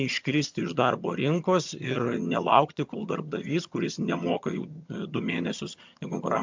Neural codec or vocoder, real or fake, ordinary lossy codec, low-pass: vocoder, 22.05 kHz, 80 mel bands, HiFi-GAN; fake; MP3, 64 kbps; 7.2 kHz